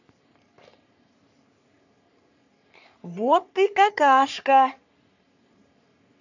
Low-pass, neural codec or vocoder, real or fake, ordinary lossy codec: 7.2 kHz; codec, 44.1 kHz, 3.4 kbps, Pupu-Codec; fake; none